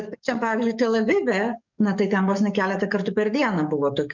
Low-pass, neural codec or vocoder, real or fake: 7.2 kHz; codec, 44.1 kHz, 7.8 kbps, DAC; fake